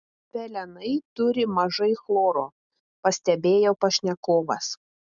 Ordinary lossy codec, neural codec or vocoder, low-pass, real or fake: MP3, 96 kbps; none; 7.2 kHz; real